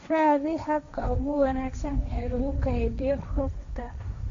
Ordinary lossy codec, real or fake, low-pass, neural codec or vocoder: AAC, 48 kbps; fake; 7.2 kHz; codec, 16 kHz, 1.1 kbps, Voila-Tokenizer